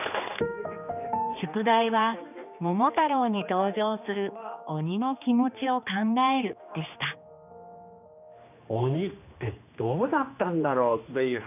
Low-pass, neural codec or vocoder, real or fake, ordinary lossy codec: 3.6 kHz; codec, 16 kHz, 2 kbps, X-Codec, HuBERT features, trained on general audio; fake; none